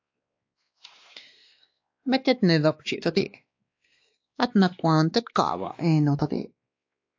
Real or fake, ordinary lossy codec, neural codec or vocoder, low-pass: fake; AAC, 48 kbps; codec, 16 kHz, 2 kbps, X-Codec, WavLM features, trained on Multilingual LibriSpeech; 7.2 kHz